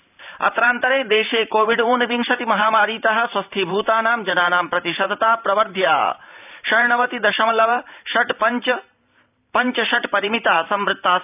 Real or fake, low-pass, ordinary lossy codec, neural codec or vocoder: fake; 3.6 kHz; none; vocoder, 44.1 kHz, 128 mel bands every 512 samples, BigVGAN v2